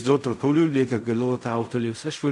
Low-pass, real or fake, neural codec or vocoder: 10.8 kHz; fake; codec, 16 kHz in and 24 kHz out, 0.4 kbps, LongCat-Audio-Codec, fine tuned four codebook decoder